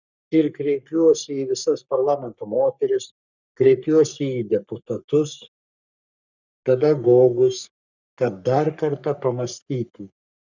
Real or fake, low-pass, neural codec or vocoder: fake; 7.2 kHz; codec, 44.1 kHz, 3.4 kbps, Pupu-Codec